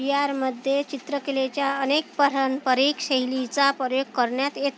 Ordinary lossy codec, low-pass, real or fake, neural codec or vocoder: none; none; real; none